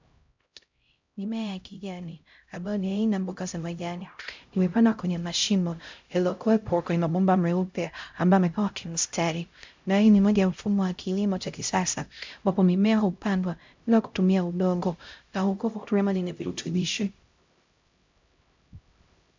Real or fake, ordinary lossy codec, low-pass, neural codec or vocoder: fake; MP3, 64 kbps; 7.2 kHz; codec, 16 kHz, 0.5 kbps, X-Codec, HuBERT features, trained on LibriSpeech